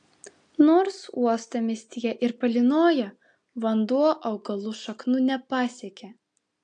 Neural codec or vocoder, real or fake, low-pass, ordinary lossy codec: none; real; 9.9 kHz; AAC, 64 kbps